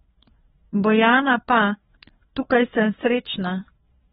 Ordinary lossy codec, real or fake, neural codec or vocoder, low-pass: AAC, 16 kbps; fake; codec, 16 kHz, 4 kbps, FreqCodec, larger model; 7.2 kHz